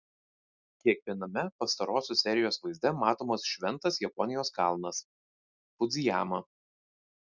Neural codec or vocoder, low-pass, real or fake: none; 7.2 kHz; real